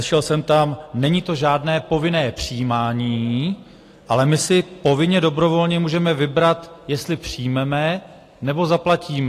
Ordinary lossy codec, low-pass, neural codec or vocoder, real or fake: AAC, 48 kbps; 14.4 kHz; none; real